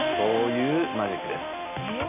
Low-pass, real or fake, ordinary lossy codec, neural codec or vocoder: 3.6 kHz; real; none; none